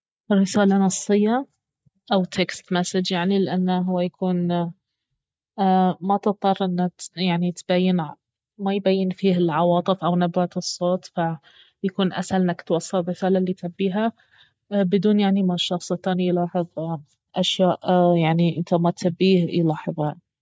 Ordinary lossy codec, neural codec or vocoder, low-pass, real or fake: none; none; none; real